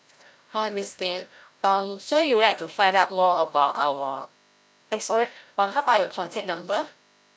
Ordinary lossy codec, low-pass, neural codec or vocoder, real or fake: none; none; codec, 16 kHz, 0.5 kbps, FreqCodec, larger model; fake